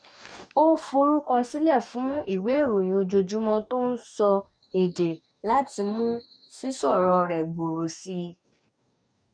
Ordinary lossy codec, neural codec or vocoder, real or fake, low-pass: none; codec, 44.1 kHz, 2.6 kbps, DAC; fake; 9.9 kHz